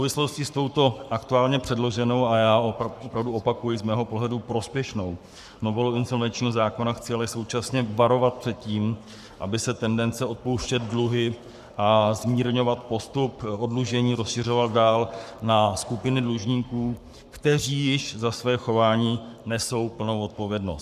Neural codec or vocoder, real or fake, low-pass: codec, 44.1 kHz, 7.8 kbps, Pupu-Codec; fake; 14.4 kHz